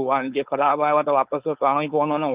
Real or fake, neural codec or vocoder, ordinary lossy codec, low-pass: fake; codec, 16 kHz, 4.8 kbps, FACodec; Opus, 32 kbps; 3.6 kHz